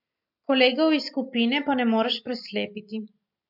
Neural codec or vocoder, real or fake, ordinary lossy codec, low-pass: none; real; MP3, 48 kbps; 5.4 kHz